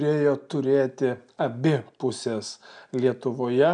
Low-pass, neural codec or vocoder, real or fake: 9.9 kHz; none; real